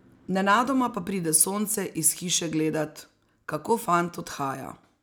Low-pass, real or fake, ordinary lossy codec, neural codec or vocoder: none; real; none; none